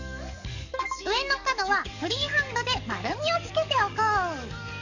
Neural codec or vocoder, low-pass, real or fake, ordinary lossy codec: codec, 44.1 kHz, 7.8 kbps, DAC; 7.2 kHz; fake; none